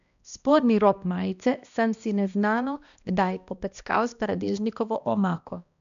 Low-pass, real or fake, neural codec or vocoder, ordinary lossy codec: 7.2 kHz; fake; codec, 16 kHz, 1 kbps, X-Codec, HuBERT features, trained on balanced general audio; none